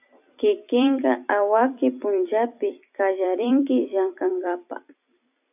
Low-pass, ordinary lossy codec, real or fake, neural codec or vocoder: 3.6 kHz; AAC, 32 kbps; fake; vocoder, 44.1 kHz, 128 mel bands every 256 samples, BigVGAN v2